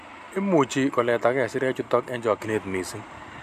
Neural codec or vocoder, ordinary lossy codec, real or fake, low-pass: none; none; real; 14.4 kHz